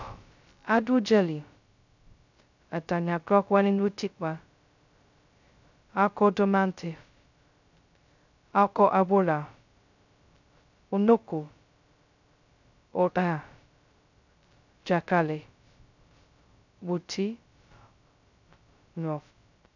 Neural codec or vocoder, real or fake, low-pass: codec, 16 kHz, 0.2 kbps, FocalCodec; fake; 7.2 kHz